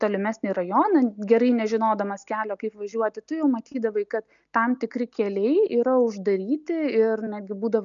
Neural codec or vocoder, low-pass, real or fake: none; 7.2 kHz; real